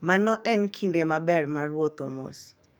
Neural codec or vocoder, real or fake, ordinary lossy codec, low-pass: codec, 44.1 kHz, 2.6 kbps, SNAC; fake; none; none